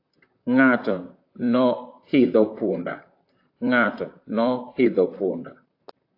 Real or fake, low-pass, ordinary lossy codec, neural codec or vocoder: fake; 5.4 kHz; AAC, 32 kbps; vocoder, 44.1 kHz, 128 mel bands, Pupu-Vocoder